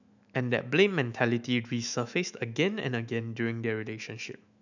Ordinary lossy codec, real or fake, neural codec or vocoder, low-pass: none; fake; autoencoder, 48 kHz, 128 numbers a frame, DAC-VAE, trained on Japanese speech; 7.2 kHz